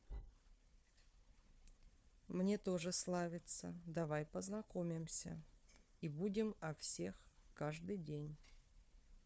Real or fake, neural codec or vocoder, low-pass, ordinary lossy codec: fake; codec, 16 kHz, 4 kbps, FunCodec, trained on Chinese and English, 50 frames a second; none; none